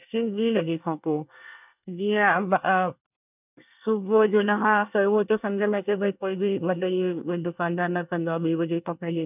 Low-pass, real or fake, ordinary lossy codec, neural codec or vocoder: 3.6 kHz; fake; none; codec, 24 kHz, 1 kbps, SNAC